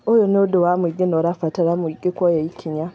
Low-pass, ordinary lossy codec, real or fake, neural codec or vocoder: none; none; real; none